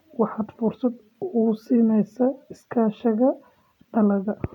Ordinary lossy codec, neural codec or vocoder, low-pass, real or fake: none; none; 19.8 kHz; real